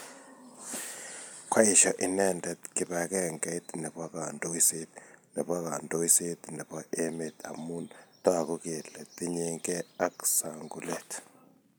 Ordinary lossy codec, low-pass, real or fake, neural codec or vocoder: none; none; real; none